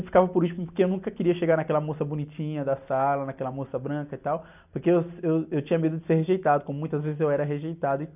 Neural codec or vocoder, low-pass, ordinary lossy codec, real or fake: none; 3.6 kHz; none; real